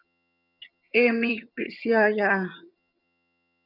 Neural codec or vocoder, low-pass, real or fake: vocoder, 22.05 kHz, 80 mel bands, HiFi-GAN; 5.4 kHz; fake